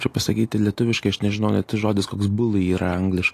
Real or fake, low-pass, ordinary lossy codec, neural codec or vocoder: real; 14.4 kHz; AAC, 48 kbps; none